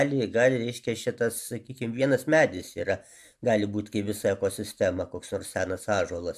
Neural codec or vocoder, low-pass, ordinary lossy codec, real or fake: none; 14.4 kHz; AAC, 96 kbps; real